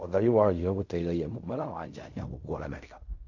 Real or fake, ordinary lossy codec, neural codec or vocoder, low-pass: fake; none; codec, 16 kHz in and 24 kHz out, 0.4 kbps, LongCat-Audio-Codec, fine tuned four codebook decoder; 7.2 kHz